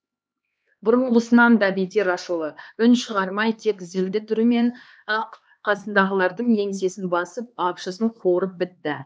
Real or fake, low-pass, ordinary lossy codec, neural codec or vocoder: fake; none; none; codec, 16 kHz, 2 kbps, X-Codec, HuBERT features, trained on LibriSpeech